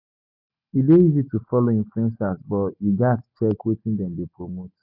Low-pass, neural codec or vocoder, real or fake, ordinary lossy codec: 5.4 kHz; none; real; none